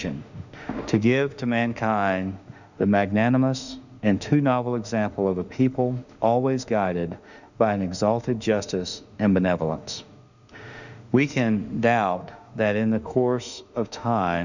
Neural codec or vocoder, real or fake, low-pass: autoencoder, 48 kHz, 32 numbers a frame, DAC-VAE, trained on Japanese speech; fake; 7.2 kHz